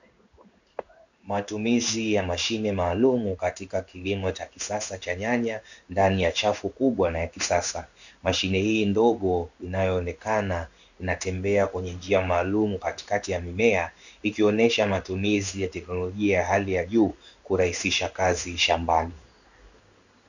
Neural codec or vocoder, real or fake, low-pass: codec, 16 kHz in and 24 kHz out, 1 kbps, XY-Tokenizer; fake; 7.2 kHz